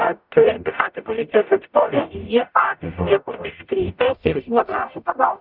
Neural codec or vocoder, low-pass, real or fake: codec, 44.1 kHz, 0.9 kbps, DAC; 5.4 kHz; fake